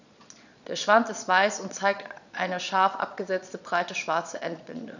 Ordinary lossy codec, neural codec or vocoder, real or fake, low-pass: none; vocoder, 22.05 kHz, 80 mel bands, Vocos; fake; 7.2 kHz